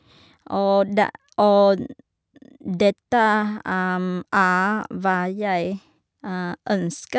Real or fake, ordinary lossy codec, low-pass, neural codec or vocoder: real; none; none; none